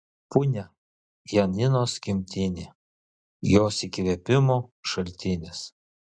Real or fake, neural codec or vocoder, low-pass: real; none; 9.9 kHz